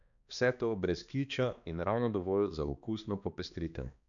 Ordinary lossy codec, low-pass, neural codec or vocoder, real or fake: none; 7.2 kHz; codec, 16 kHz, 2 kbps, X-Codec, HuBERT features, trained on balanced general audio; fake